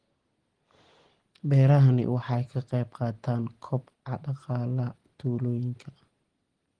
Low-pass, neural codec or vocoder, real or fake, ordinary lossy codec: 9.9 kHz; none; real; Opus, 16 kbps